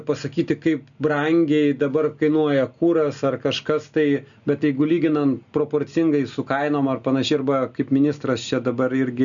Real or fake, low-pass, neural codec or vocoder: real; 7.2 kHz; none